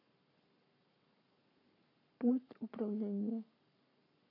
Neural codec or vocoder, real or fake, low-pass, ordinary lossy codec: codec, 44.1 kHz, 7.8 kbps, Pupu-Codec; fake; 5.4 kHz; MP3, 48 kbps